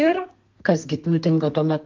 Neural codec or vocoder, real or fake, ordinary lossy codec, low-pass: codec, 32 kHz, 1.9 kbps, SNAC; fake; Opus, 24 kbps; 7.2 kHz